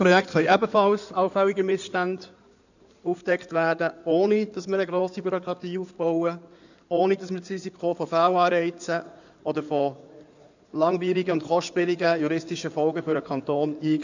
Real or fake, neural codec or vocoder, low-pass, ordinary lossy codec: fake; codec, 16 kHz in and 24 kHz out, 2.2 kbps, FireRedTTS-2 codec; 7.2 kHz; none